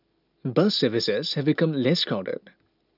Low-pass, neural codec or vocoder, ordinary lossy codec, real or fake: 5.4 kHz; none; none; real